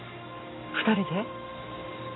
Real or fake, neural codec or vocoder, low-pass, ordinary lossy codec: real; none; 7.2 kHz; AAC, 16 kbps